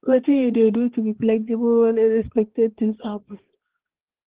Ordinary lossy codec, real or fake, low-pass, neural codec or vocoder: Opus, 16 kbps; fake; 3.6 kHz; codec, 16 kHz, 2 kbps, X-Codec, HuBERT features, trained on balanced general audio